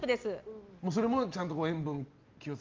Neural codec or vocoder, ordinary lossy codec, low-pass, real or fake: none; Opus, 32 kbps; 7.2 kHz; real